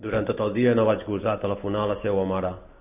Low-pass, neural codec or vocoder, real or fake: 3.6 kHz; none; real